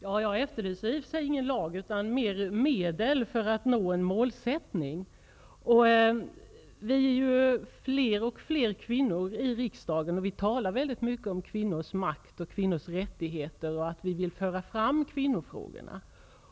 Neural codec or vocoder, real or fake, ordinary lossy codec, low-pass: none; real; none; none